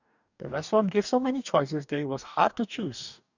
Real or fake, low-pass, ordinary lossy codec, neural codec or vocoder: fake; 7.2 kHz; none; codec, 44.1 kHz, 2.6 kbps, DAC